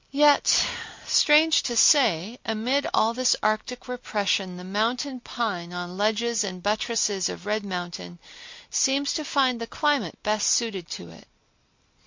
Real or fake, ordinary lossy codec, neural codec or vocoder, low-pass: real; MP3, 48 kbps; none; 7.2 kHz